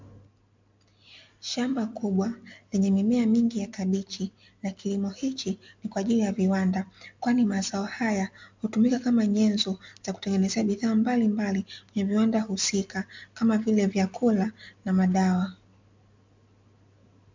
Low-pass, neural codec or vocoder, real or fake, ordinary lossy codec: 7.2 kHz; none; real; MP3, 64 kbps